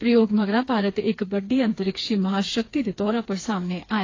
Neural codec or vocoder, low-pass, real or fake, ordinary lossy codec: codec, 16 kHz, 4 kbps, FreqCodec, smaller model; 7.2 kHz; fake; AAC, 32 kbps